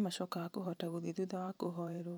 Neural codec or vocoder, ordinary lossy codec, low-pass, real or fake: vocoder, 44.1 kHz, 128 mel bands every 512 samples, BigVGAN v2; none; none; fake